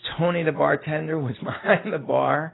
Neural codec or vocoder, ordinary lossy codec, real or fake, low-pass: none; AAC, 16 kbps; real; 7.2 kHz